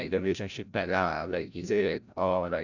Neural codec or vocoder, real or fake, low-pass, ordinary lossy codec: codec, 16 kHz, 0.5 kbps, FreqCodec, larger model; fake; 7.2 kHz; none